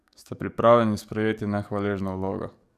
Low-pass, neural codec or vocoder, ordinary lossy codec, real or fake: 14.4 kHz; codec, 44.1 kHz, 7.8 kbps, DAC; none; fake